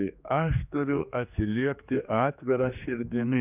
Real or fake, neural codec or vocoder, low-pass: fake; codec, 16 kHz, 2 kbps, X-Codec, HuBERT features, trained on general audio; 3.6 kHz